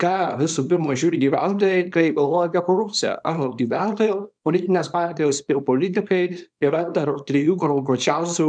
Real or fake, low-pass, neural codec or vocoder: fake; 9.9 kHz; codec, 24 kHz, 0.9 kbps, WavTokenizer, small release